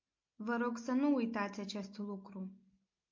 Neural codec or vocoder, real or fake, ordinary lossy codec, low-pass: none; real; MP3, 48 kbps; 7.2 kHz